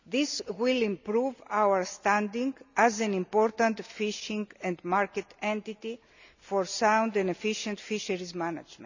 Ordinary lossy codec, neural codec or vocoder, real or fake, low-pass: none; none; real; 7.2 kHz